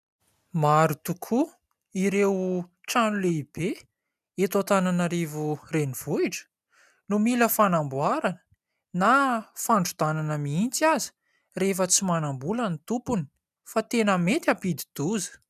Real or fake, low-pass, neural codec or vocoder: real; 14.4 kHz; none